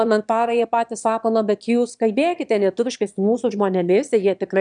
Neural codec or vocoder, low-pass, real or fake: autoencoder, 22.05 kHz, a latent of 192 numbers a frame, VITS, trained on one speaker; 9.9 kHz; fake